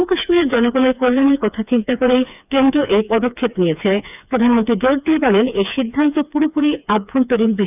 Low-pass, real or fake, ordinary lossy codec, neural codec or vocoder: 3.6 kHz; fake; none; codec, 16 kHz, 4 kbps, FreqCodec, smaller model